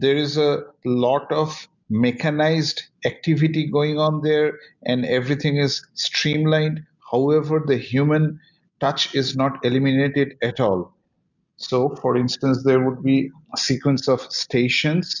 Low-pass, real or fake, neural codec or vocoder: 7.2 kHz; real; none